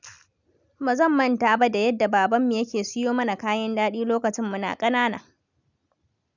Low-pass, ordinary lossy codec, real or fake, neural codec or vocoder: 7.2 kHz; none; real; none